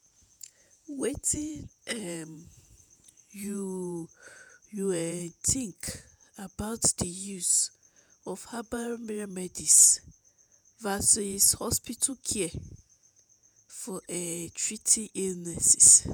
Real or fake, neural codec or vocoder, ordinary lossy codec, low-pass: fake; vocoder, 48 kHz, 128 mel bands, Vocos; none; none